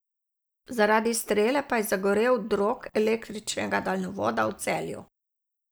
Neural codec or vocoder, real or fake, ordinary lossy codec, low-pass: none; real; none; none